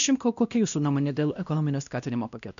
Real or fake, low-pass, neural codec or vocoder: fake; 7.2 kHz; codec, 16 kHz, 1 kbps, X-Codec, WavLM features, trained on Multilingual LibriSpeech